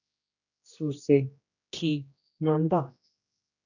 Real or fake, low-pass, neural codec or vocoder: fake; 7.2 kHz; codec, 16 kHz, 1 kbps, X-Codec, HuBERT features, trained on general audio